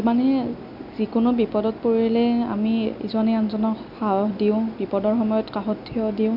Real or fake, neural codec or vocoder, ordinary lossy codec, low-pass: real; none; none; 5.4 kHz